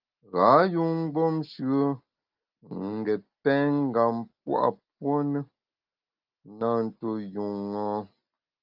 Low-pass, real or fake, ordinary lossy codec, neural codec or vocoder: 5.4 kHz; real; Opus, 24 kbps; none